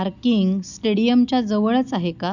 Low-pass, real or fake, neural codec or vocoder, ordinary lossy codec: 7.2 kHz; real; none; none